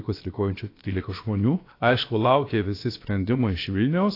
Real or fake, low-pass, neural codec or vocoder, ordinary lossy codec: fake; 5.4 kHz; codec, 16 kHz, about 1 kbps, DyCAST, with the encoder's durations; AAC, 32 kbps